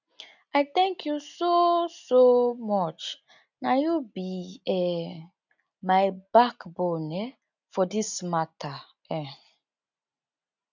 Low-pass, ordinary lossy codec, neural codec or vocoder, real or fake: 7.2 kHz; none; none; real